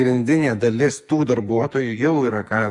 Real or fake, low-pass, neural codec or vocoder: fake; 10.8 kHz; codec, 44.1 kHz, 2.6 kbps, SNAC